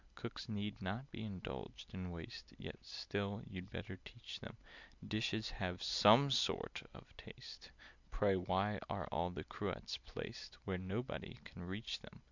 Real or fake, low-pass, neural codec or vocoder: real; 7.2 kHz; none